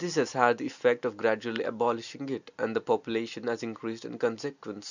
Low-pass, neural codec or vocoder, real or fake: 7.2 kHz; none; real